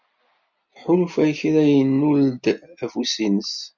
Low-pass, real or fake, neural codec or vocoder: 7.2 kHz; real; none